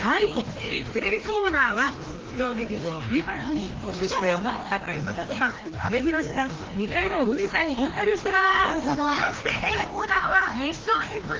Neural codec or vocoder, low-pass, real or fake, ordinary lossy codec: codec, 16 kHz, 1 kbps, FreqCodec, larger model; 7.2 kHz; fake; Opus, 16 kbps